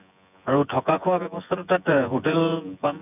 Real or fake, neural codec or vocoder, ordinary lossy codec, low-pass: fake; vocoder, 24 kHz, 100 mel bands, Vocos; none; 3.6 kHz